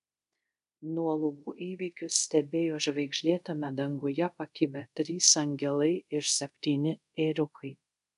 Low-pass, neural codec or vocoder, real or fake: 10.8 kHz; codec, 24 kHz, 0.5 kbps, DualCodec; fake